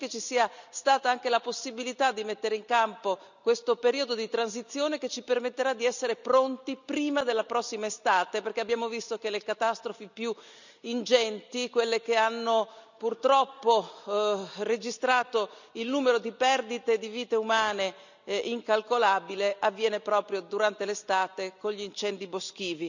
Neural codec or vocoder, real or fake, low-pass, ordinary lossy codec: none; real; 7.2 kHz; none